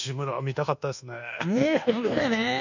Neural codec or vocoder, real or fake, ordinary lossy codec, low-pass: codec, 24 kHz, 1.2 kbps, DualCodec; fake; none; 7.2 kHz